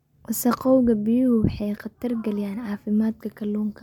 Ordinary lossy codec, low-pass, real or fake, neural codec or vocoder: MP3, 96 kbps; 19.8 kHz; real; none